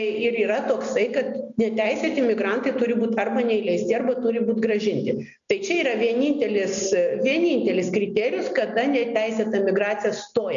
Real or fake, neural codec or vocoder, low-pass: real; none; 7.2 kHz